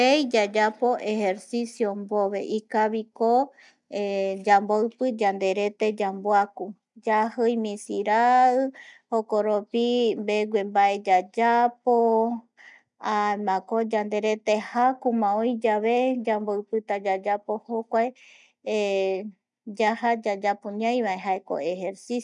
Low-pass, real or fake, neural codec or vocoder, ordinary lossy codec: 10.8 kHz; real; none; none